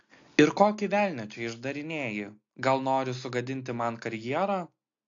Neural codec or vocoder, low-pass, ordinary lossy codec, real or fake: none; 7.2 kHz; AAC, 48 kbps; real